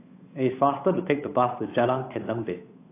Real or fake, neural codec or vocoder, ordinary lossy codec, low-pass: fake; codec, 16 kHz, 8 kbps, FunCodec, trained on Chinese and English, 25 frames a second; AAC, 24 kbps; 3.6 kHz